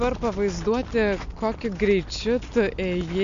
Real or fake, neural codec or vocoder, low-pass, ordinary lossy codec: real; none; 7.2 kHz; MP3, 64 kbps